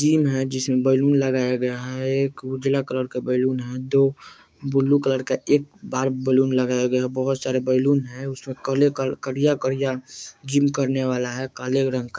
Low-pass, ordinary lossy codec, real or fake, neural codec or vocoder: none; none; fake; codec, 16 kHz, 6 kbps, DAC